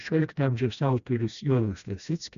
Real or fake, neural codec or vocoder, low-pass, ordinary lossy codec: fake; codec, 16 kHz, 1 kbps, FreqCodec, smaller model; 7.2 kHz; MP3, 96 kbps